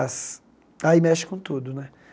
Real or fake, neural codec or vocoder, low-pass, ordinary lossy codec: real; none; none; none